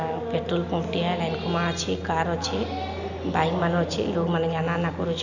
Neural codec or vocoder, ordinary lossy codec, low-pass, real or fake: none; none; 7.2 kHz; real